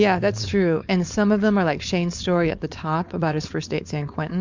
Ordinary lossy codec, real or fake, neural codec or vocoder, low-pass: MP3, 64 kbps; fake; codec, 16 kHz, 4.8 kbps, FACodec; 7.2 kHz